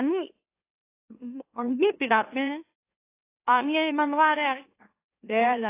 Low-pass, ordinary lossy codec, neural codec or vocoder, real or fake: 3.6 kHz; AAC, 24 kbps; autoencoder, 44.1 kHz, a latent of 192 numbers a frame, MeloTTS; fake